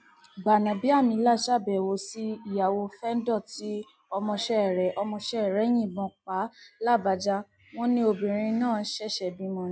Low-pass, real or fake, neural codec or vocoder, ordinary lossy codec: none; real; none; none